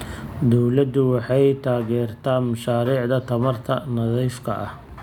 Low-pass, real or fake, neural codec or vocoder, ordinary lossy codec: 19.8 kHz; real; none; none